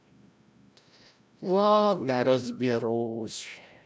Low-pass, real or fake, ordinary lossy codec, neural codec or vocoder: none; fake; none; codec, 16 kHz, 0.5 kbps, FreqCodec, larger model